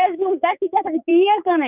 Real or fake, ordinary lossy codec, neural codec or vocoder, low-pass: fake; none; codec, 16 kHz, 4 kbps, X-Codec, HuBERT features, trained on general audio; 3.6 kHz